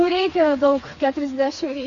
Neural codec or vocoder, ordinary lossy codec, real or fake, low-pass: codec, 16 kHz, 4 kbps, FreqCodec, smaller model; AAC, 48 kbps; fake; 7.2 kHz